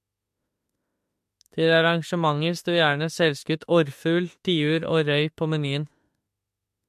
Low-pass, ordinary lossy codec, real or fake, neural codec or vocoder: 14.4 kHz; MP3, 64 kbps; fake; autoencoder, 48 kHz, 32 numbers a frame, DAC-VAE, trained on Japanese speech